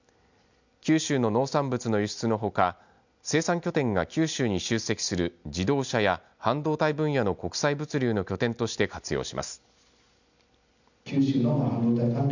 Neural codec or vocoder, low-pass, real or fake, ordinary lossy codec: none; 7.2 kHz; real; none